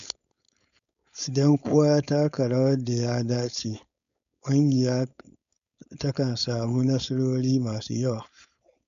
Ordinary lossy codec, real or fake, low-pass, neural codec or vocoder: none; fake; 7.2 kHz; codec, 16 kHz, 4.8 kbps, FACodec